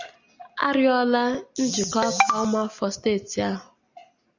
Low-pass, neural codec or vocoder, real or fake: 7.2 kHz; none; real